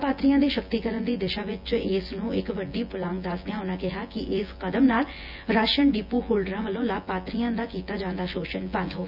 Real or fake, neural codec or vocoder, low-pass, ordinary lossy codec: fake; vocoder, 24 kHz, 100 mel bands, Vocos; 5.4 kHz; none